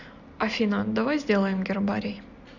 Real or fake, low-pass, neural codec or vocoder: real; 7.2 kHz; none